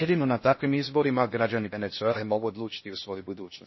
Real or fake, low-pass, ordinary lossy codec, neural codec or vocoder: fake; 7.2 kHz; MP3, 24 kbps; codec, 16 kHz in and 24 kHz out, 0.6 kbps, FocalCodec, streaming, 2048 codes